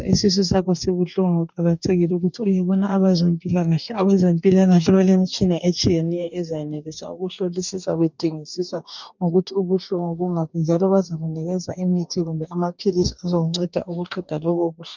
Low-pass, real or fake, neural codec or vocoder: 7.2 kHz; fake; codec, 44.1 kHz, 2.6 kbps, DAC